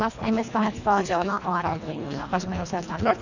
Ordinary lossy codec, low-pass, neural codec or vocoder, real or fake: none; 7.2 kHz; codec, 24 kHz, 1.5 kbps, HILCodec; fake